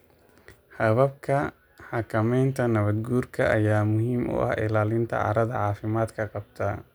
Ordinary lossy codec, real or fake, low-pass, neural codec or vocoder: none; real; none; none